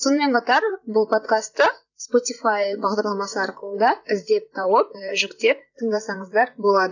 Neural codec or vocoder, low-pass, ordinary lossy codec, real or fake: vocoder, 44.1 kHz, 128 mel bands, Pupu-Vocoder; 7.2 kHz; none; fake